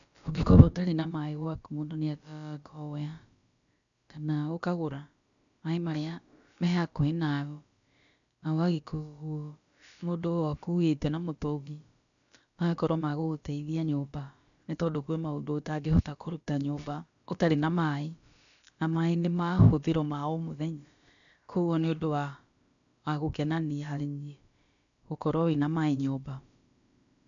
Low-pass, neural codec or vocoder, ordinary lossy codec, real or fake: 7.2 kHz; codec, 16 kHz, about 1 kbps, DyCAST, with the encoder's durations; none; fake